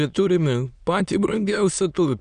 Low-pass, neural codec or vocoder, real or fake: 9.9 kHz; autoencoder, 22.05 kHz, a latent of 192 numbers a frame, VITS, trained on many speakers; fake